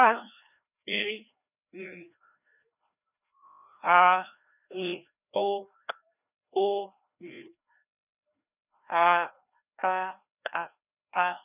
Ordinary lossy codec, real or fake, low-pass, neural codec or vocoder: none; fake; 3.6 kHz; codec, 16 kHz, 1 kbps, FreqCodec, larger model